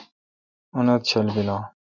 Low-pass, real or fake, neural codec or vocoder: 7.2 kHz; real; none